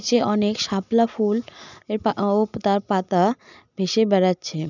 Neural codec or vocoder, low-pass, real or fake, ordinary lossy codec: none; 7.2 kHz; real; none